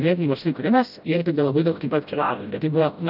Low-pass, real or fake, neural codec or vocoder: 5.4 kHz; fake; codec, 16 kHz, 0.5 kbps, FreqCodec, smaller model